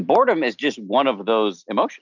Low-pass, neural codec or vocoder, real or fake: 7.2 kHz; none; real